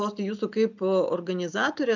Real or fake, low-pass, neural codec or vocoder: real; 7.2 kHz; none